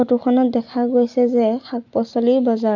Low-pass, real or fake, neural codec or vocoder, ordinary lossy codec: 7.2 kHz; real; none; none